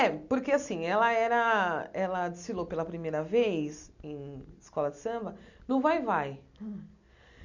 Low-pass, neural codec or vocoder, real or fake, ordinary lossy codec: 7.2 kHz; none; real; none